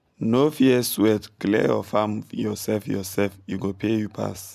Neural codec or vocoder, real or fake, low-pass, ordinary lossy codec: none; real; 14.4 kHz; MP3, 96 kbps